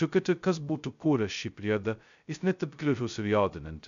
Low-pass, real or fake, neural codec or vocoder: 7.2 kHz; fake; codec, 16 kHz, 0.2 kbps, FocalCodec